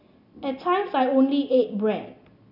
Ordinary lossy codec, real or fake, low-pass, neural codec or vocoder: none; real; 5.4 kHz; none